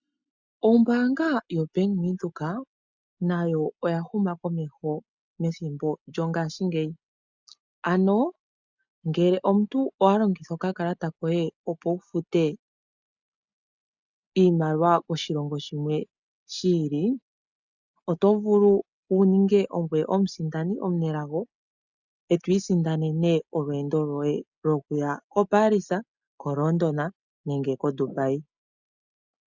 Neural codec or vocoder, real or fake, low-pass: none; real; 7.2 kHz